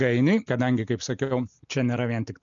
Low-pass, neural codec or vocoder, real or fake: 7.2 kHz; none; real